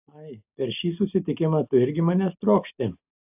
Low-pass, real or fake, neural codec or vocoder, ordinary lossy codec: 3.6 kHz; real; none; AAC, 32 kbps